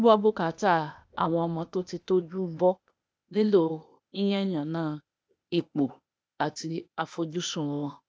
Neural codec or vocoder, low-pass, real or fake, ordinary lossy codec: codec, 16 kHz, 0.8 kbps, ZipCodec; none; fake; none